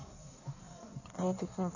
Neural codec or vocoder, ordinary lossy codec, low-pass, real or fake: codec, 16 kHz in and 24 kHz out, 1.1 kbps, FireRedTTS-2 codec; none; 7.2 kHz; fake